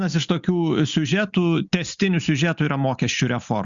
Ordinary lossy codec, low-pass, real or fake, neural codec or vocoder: Opus, 64 kbps; 7.2 kHz; real; none